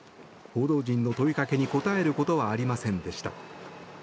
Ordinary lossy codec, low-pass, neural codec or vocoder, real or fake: none; none; none; real